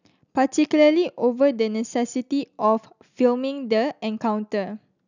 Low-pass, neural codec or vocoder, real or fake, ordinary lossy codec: 7.2 kHz; none; real; none